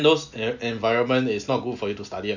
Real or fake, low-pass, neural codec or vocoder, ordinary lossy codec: real; 7.2 kHz; none; none